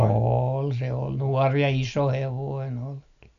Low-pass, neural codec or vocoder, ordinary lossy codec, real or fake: 7.2 kHz; none; none; real